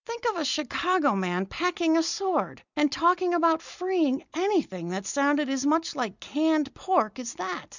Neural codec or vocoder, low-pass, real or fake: none; 7.2 kHz; real